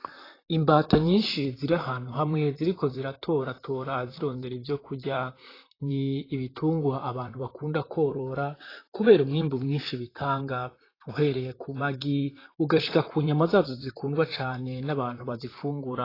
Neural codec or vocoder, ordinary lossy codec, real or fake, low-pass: vocoder, 44.1 kHz, 128 mel bands, Pupu-Vocoder; AAC, 24 kbps; fake; 5.4 kHz